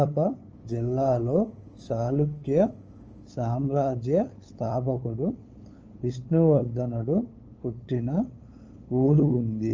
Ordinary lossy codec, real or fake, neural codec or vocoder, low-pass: Opus, 24 kbps; fake; codec, 16 kHz, 8 kbps, FunCodec, trained on LibriTTS, 25 frames a second; 7.2 kHz